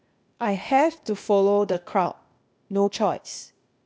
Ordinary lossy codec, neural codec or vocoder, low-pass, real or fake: none; codec, 16 kHz, 0.8 kbps, ZipCodec; none; fake